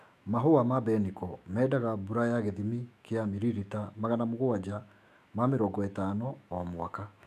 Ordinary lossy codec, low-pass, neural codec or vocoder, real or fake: none; 14.4 kHz; autoencoder, 48 kHz, 128 numbers a frame, DAC-VAE, trained on Japanese speech; fake